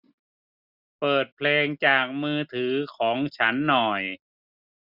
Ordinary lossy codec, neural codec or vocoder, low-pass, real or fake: none; none; 5.4 kHz; real